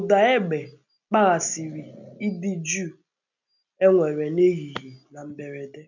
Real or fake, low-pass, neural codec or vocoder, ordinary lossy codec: real; 7.2 kHz; none; none